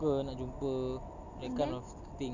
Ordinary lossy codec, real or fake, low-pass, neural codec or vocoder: none; real; 7.2 kHz; none